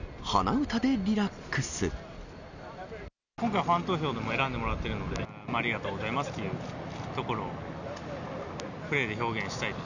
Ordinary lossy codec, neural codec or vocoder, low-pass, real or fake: none; none; 7.2 kHz; real